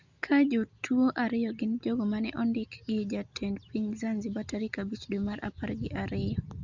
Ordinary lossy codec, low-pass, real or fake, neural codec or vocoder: Opus, 64 kbps; 7.2 kHz; real; none